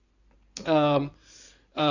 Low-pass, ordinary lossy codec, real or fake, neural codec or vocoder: 7.2 kHz; none; fake; codec, 16 kHz in and 24 kHz out, 2.2 kbps, FireRedTTS-2 codec